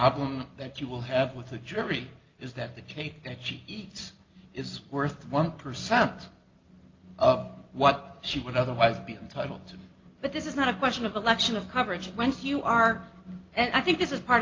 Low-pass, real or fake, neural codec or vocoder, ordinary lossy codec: 7.2 kHz; real; none; Opus, 32 kbps